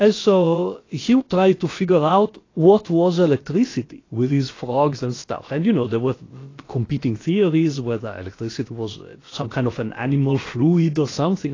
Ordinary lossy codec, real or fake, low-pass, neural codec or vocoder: AAC, 32 kbps; fake; 7.2 kHz; codec, 16 kHz, about 1 kbps, DyCAST, with the encoder's durations